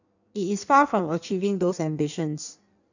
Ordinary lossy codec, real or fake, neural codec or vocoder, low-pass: none; fake; codec, 16 kHz in and 24 kHz out, 1.1 kbps, FireRedTTS-2 codec; 7.2 kHz